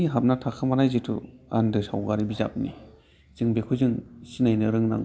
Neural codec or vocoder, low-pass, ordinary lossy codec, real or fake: none; none; none; real